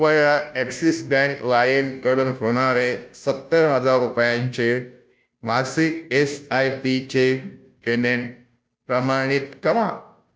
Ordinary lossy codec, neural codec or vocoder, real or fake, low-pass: none; codec, 16 kHz, 0.5 kbps, FunCodec, trained on Chinese and English, 25 frames a second; fake; none